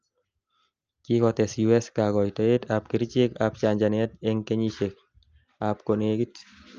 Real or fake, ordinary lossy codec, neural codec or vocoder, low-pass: real; Opus, 24 kbps; none; 7.2 kHz